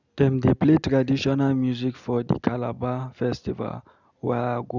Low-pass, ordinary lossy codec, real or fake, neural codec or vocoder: 7.2 kHz; none; real; none